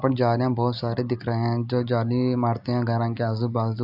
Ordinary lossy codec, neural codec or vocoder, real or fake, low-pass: AAC, 48 kbps; none; real; 5.4 kHz